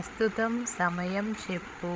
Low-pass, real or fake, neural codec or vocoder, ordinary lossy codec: none; fake; codec, 16 kHz, 16 kbps, FreqCodec, larger model; none